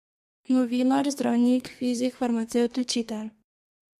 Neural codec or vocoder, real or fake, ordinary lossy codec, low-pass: codec, 32 kHz, 1.9 kbps, SNAC; fake; MP3, 64 kbps; 14.4 kHz